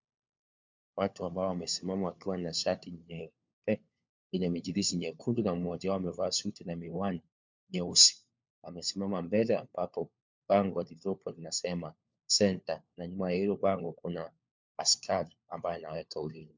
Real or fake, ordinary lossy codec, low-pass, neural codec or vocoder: fake; MP3, 64 kbps; 7.2 kHz; codec, 16 kHz, 4 kbps, FunCodec, trained on LibriTTS, 50 frames a second